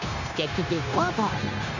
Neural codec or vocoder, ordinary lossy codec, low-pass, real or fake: codec, 16 kHz, 0.9 kbps, LongCat-Audio-Codec; none; 7.2 kHz; fake